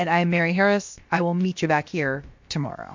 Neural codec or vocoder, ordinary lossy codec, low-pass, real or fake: codec, 16 kHz, about 1 kbps, DyCAST, with the encoder's durations; MP3, 48 kbps; 7.2 kHz; fake